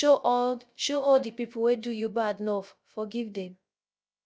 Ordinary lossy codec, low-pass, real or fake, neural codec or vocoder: none; none; fake; codec, 16 kHz, 0.3 kbps, FocalCodec